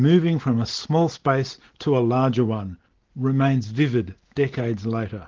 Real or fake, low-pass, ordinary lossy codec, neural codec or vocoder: real; 7.2 kHz; Opus, 16 kbps; none